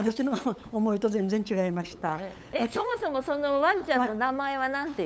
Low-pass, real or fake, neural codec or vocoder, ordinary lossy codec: none; fake; codec, 16 kHz, 8 kbps, FunCodec, trained on LibriTTS, 25 frames a second; none